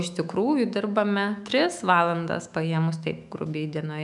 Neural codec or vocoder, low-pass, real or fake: autoencoder, 48 kHz, 128 numbers a frame, DAC-VAE, trained on Japanese speech; 10.8 kHz; fake